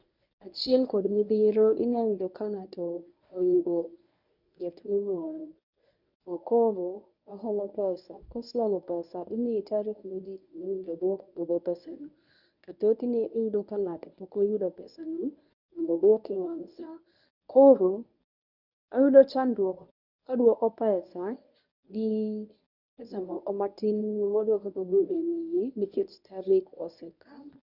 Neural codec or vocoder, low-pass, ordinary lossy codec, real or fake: codec, 24 kHz, 0.9 kbps, WavTokenizer, medium speech release version 1; 5.4 kHz; none; fake